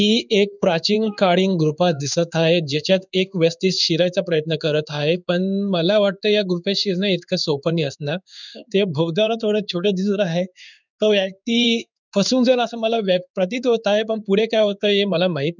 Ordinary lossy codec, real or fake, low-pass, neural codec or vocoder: none; fake; 7.2 kHz; codec, 16 kHz in and 24 kHz out, 1 kbps, XY-Tokenizer